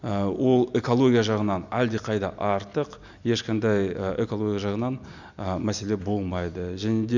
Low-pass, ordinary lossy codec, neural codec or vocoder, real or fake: 7.2 kHz; none; none; real